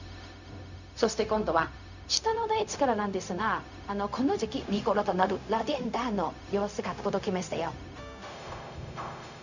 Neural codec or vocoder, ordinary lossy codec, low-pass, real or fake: codec, 16 kHz, 0.4 kbps, LongCat-Audio-Codec; none; 7.2 kHz; fake